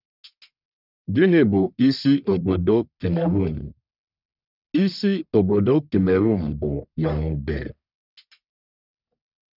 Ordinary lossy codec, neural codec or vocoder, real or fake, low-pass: none; codec, 44.1 kHz, 1.7 kbps, Pupu-Codec; fake; 5.4 kHz